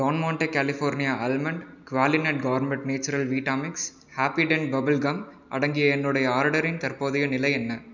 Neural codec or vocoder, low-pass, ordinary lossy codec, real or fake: none; 7.2 kHz; none; real